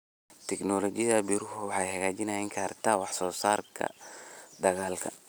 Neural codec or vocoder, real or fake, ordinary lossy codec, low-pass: none; real; none; none